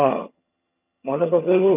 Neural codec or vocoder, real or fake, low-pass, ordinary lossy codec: vocoder, 22.05 kHz, 80 mel bands, HiFi-GAN; fake; 3.6 kHz; none